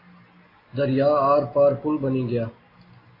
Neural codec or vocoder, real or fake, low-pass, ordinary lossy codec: none; real; 5.4 kHz; AAC, 24 kbps